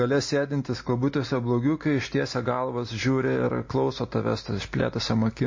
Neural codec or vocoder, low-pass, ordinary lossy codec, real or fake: none; 7.2 kHz; MP3, 32 kbps; real